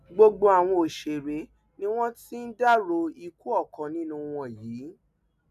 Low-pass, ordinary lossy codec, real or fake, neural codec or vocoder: 14.4 kHz; none; real; none